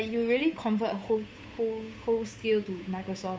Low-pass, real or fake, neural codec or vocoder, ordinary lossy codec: none; fake; codec, 16 kHz, 8 kbps, FunCodec, trained on Chinese and English, 25 frames a second; none